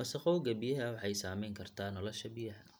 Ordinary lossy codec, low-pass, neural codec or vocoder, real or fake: none; none; none; real